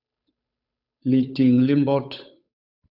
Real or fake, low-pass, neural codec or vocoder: fake; 5.4 kHz; codec, 16 kHz, 8 kbps, FunCodec, trained on Chinese and English, 25 frames a second